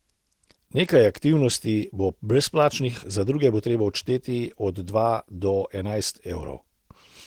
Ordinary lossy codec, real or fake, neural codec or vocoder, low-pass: Opus, 16 kbps; real; none; 19.8 kHz